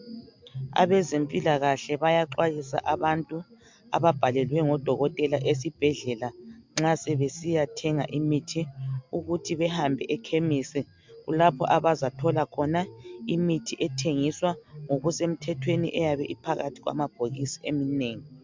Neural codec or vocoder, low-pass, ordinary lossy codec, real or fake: none; 7.2 kHz; MP3, 64 kbps; real